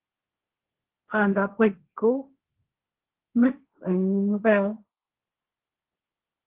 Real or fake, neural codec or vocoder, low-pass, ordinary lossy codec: fake; codec, 16 kHz, 1.1 kbps, Voila-Tokenizer; 3.6 kHz; Opus, 16 kbps